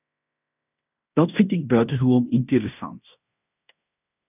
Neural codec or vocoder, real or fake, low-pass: codec, 16 kHz in and 24 kHz out, 0.9 kbps, LongCat-Audio-Codec, fine tuned four codebook decoder; fake; 3.6 kHz